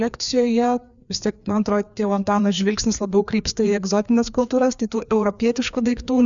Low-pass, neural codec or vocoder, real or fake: 7.2 kHz; codec, 16 kHz, 2 kbps, FreqCodec, larger model; fake